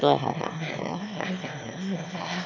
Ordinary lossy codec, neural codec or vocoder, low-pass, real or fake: none; autoencoder, 22.05 kHz, a latent of 192 numbers a frame, VITS, trained on one speaker; 7.2 kHz; fake